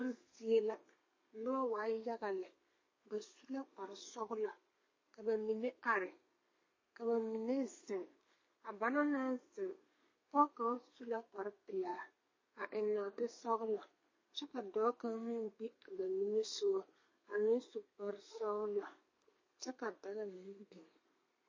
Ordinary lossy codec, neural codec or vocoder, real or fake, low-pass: MP3, 32 kbps; codec, 32 kHz, 1.9 kbps, SNAC; fake; 7.2 kHz